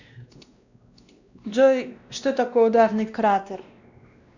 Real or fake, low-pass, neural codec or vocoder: fake; 7.2 kHz; codec, 16 kHz, 1 kbps, X-Codec, WavLM features, trained on Multilingual LibriSpeech